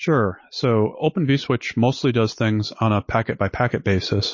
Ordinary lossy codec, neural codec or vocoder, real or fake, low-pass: MP3, 32 kbps; none; real; 7.2 kHz